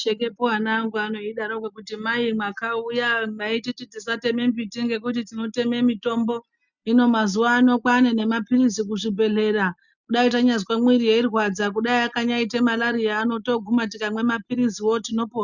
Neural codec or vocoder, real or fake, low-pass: none; real; 7.2 kHz